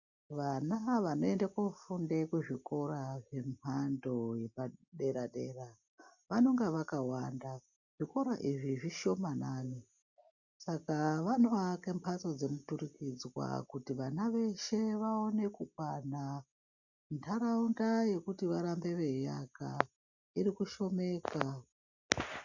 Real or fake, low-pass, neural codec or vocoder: real; 7.2 kHz; none